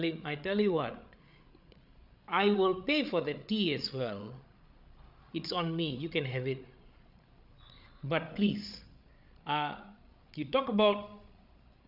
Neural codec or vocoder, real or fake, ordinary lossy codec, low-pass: codec, 16 kHz, 8 kbps, FreqCodec, larger model; fake; none; 5.4 kHz